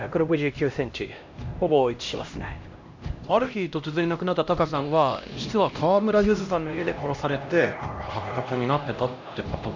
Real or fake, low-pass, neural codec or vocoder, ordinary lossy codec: fake; 7.2 kHz; codec, 16 kHz, 1 kbps, X-Codec, HuBERT features, trained on LibriSpeech; MP3, 64 kbps